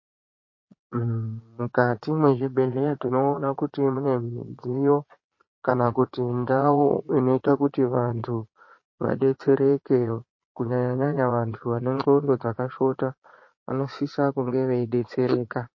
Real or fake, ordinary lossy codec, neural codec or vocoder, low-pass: fake; MP3, 32 kbps; vocoder, 22.05 kHz, 80 mel bands, Vocos; 7.2 kHz